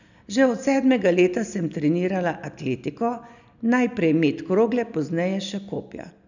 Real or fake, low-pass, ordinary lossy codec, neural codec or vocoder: real; 7.2 kHz; none; none